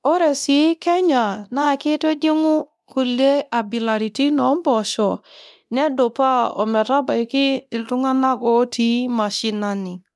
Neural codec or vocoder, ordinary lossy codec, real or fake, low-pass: codec, 24 kHz, 0.9 kbps, DualCodec; none; fake; none